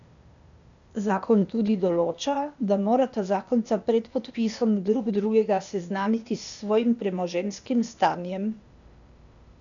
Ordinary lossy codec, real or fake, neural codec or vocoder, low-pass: none; fake; codec, 16 kHz, 0.8 kbps, ZipCodec; 7.2 kHz